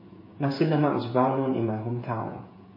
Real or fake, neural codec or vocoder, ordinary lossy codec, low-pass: fake; codec, 16 kHz, 8 kbps, FreqCodec, smaller model; MP3, 24 kbps; 5.4 kHz